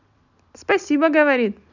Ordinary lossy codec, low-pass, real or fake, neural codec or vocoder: none; 7.2 kHz; real; none